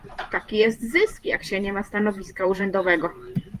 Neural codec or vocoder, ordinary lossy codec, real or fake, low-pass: vocoder, 44.1 kHz, 128 mel bands every 512 samples, BigVGAN v2; Opus, 32 kbps; fake; 14.4 kHz